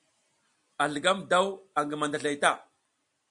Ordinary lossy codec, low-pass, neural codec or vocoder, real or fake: Opus, 64 kbps; 10.8 kHz; none; real